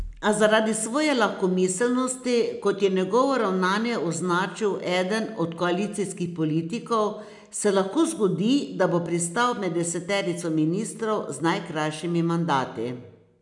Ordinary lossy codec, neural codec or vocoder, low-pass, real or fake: none; none; 10.8 kHz; real